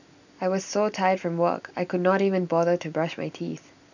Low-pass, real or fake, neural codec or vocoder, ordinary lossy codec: 7.2 kHz; real; none; none